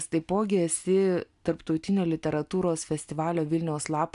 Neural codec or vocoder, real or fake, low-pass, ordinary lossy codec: none; real; 10.8 kHz; AAC, 96 kbps